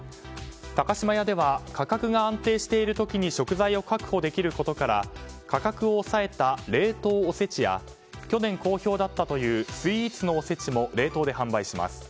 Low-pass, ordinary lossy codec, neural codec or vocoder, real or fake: none; none; none; real